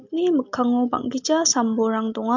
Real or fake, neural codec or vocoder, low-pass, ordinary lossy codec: real; none; 7.2 kHz; MP3, 64 kbps